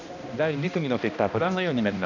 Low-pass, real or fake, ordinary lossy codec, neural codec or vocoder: 7.2 kHz; fake; none; codec, 16 kHz, 1 kbps, X-Codec, HuBERT features, trained on balanced general audio